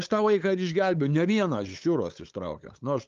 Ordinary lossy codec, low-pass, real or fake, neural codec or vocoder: Opus, 32 kbps; 7.2 kHz; fake; codec, 16 kHz, 8 kbps, FunCodec, trained on LibriTTS, 25 frames a second